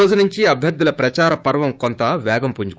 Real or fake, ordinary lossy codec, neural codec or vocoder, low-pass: fake; none; codec, 16 kHz, 6 kbps, DAC; none